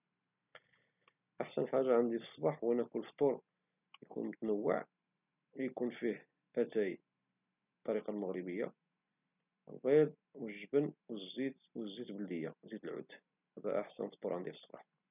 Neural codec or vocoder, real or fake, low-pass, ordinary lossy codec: none; real; 3.6 kHz; none